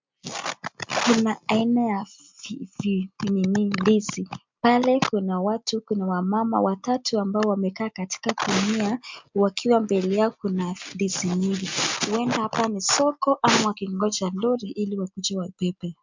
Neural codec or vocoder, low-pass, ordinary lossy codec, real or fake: none; 7.2 kHz; MP3, 64 kbps; real